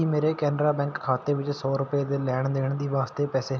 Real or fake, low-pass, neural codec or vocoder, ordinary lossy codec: real; none; none; none